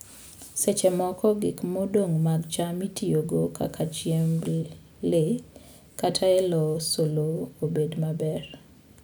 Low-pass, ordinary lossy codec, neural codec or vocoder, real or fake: none; none; none; real